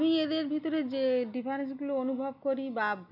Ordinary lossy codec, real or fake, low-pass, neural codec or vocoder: none; real; 5.4 kHz; none